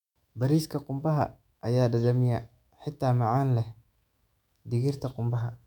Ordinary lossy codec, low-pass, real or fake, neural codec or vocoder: none; 19.8 kHz; fake; autoencoder, 48 kHz, 128 numbers a frame, DAC-VAE, trained on Japanese speech